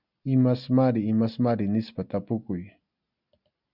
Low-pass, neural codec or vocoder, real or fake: 5.4 kHz; none; real